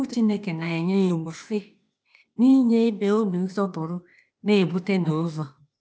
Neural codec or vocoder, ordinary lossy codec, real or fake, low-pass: codec, 16 kHz, 0.8 kbps, ZipCodec; none; fake; none